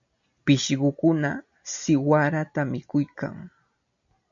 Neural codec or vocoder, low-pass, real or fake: none; 7.2 kHz; real